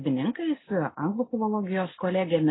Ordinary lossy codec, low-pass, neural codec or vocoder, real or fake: AAC, 16 kbps; 7.2 kHz; none; real